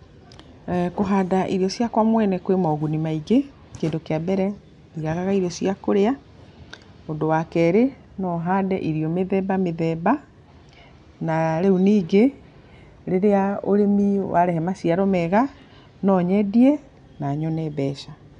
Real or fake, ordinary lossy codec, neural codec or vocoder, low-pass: real; none; none; 10.8 kHz